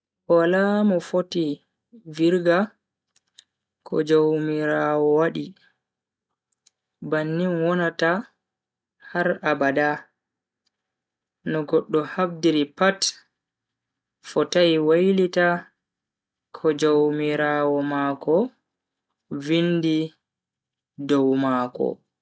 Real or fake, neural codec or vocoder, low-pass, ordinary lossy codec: real; none; none; none